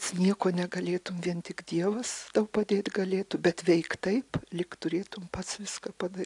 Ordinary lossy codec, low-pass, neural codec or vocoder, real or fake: AAC, 64 kbps; 10.8 kHz; none; real